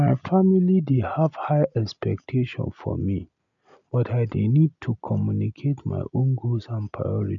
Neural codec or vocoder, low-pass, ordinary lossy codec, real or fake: none; 7.2 kHz; none; real